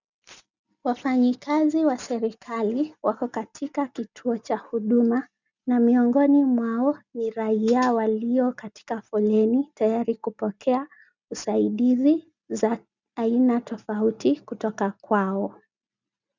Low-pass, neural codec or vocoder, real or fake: 7.2 kHz; none; real